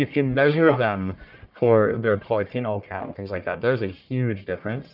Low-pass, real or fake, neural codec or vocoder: 5.4 kHz; fake; codec, 44.1 kHz, 1.7 kbps, Pupu-Codec